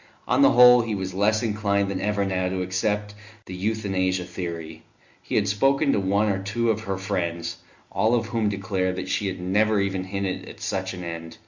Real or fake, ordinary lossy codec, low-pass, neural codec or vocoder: real; Opus, 64 kbps; 7.2 kHz; none